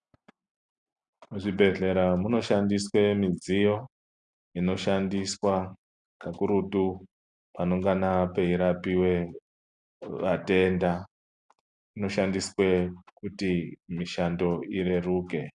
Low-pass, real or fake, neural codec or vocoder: 9.9 kHz; real; none